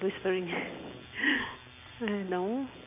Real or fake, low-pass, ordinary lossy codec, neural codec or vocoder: real; 3.6 kHz; AAC, 24 kbps; none